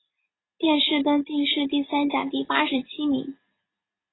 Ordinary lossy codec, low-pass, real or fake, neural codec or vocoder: AAC, 16 kbps; 7.2 kHz; real; none